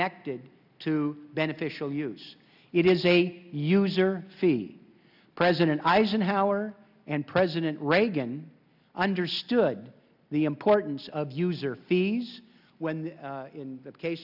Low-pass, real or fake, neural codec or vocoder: 5.4 kHz; real; none